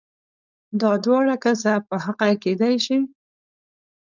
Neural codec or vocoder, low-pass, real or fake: codec, 16 kHz, 4.8 kbps, FACodec; 7.2 kHz; fake